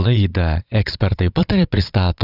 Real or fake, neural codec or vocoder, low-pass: fake; vocoder, 22.05 kHz, 80 mel bands, Vocos; 5.4 kHz